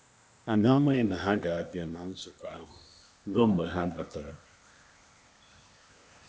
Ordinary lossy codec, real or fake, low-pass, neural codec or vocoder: none; fake; none; codec, 16 kHz, 0.8 kbps, ZipCodec